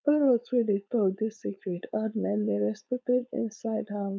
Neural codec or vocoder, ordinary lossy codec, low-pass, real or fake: codec, 16 kHz, 4.8 kbps, FACodec; none; none; fake